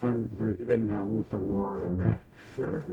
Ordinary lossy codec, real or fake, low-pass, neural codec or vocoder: none; fake; 19.8 kHz; codec, 44.1 kHz, 0.9 kbps, DAC